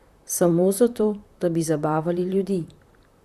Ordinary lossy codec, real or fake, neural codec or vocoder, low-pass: Opus, 64 kbps; fake; vocoder, 44.1 kHz, 128 mel bands, Pupu-Vocoder; 14.4 kHz